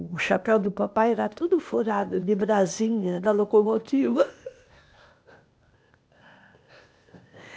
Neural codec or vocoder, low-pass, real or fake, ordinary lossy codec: codec, 16 kHz, 0.8 kbps, ZipCodec; none; fake; none